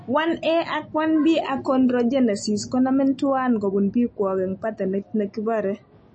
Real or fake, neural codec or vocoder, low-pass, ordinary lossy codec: real; none; 10.8 kHz; MP3, 32 kbps